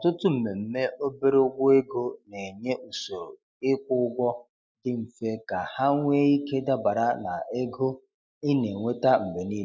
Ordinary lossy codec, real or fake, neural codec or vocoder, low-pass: none; real; none; none